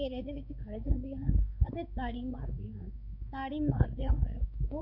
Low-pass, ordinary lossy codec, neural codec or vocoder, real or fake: 5.4 kHz; none; codec, 16 kHz, 4 kbps, X-Codec, WavLM features, trained on Multilingual LibriSpeech; fake